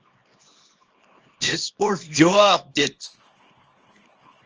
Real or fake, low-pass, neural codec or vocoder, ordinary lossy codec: fake; 7.2 kHz; codec, 24 kHz, 0.9 kbps, WavTokenizer, small release; Opus, 24 kbps